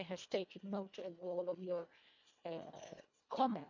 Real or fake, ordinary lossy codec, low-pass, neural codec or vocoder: fake; MP3, 64 kbps; 7.2 kHz; codec, 24 kHz, 1.5 kbps, HILCodec